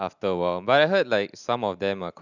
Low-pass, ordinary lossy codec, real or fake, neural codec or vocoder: 7.2 kHz; none; real; none